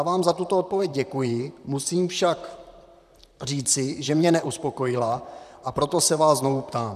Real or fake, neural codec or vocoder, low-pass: fake; vocoder, 44.1 kHz, 128 mel bands, Pupu-Vocoder; 14.4 kHz